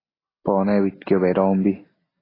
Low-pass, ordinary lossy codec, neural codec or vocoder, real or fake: 5.4 kHz; AAC, 24 kbps; none; real